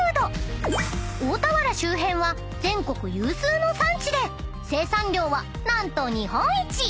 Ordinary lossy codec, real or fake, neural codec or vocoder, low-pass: none; real; none; none